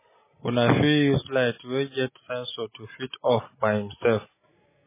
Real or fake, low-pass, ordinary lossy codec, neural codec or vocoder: real; 3.6 kHz; MP3, 16 kbps; none